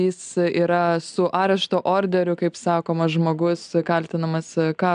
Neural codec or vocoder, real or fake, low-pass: none; real; 9.9 kHz